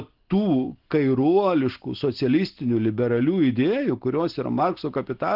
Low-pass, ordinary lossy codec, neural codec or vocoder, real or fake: 5.4 kHz; Opus, 16 kbps; none; real